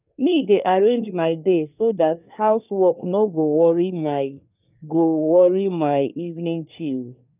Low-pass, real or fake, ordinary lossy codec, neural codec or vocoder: 3.6 kHz; fake; none; codec, 24 kHz, 1 kbps, SNAC